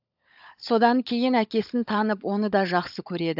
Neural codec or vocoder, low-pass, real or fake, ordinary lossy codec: codec, 16 kHz, 16 kbps, FunCodec, trained on LibriTTS, 50 frames a second; 5.4 kHz; fake; AAC, 48 kbps